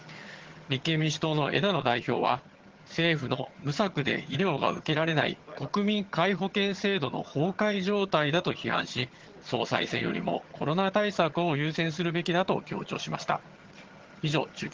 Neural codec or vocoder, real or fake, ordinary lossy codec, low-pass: vocoder, 22.05 kHz, 80 mel bands, HiFi-GAN; fake; Opus, 16 kbps; 7.2 kHz